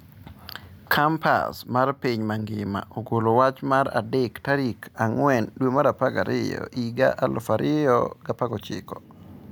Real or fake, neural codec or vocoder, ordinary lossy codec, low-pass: real; none; none; none